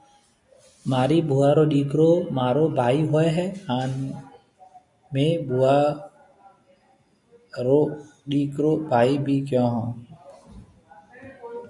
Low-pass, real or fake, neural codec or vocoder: 10.8 kHz; real; none